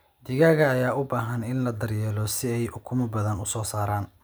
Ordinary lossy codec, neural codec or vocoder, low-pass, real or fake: none; none; none; real